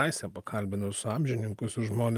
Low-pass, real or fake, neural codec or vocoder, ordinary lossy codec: 14.4 kHz; real; none; Opus, 32 kbps